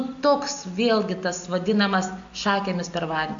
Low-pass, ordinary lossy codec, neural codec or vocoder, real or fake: 7.2 kHz; AAC, 64 kbps; none; real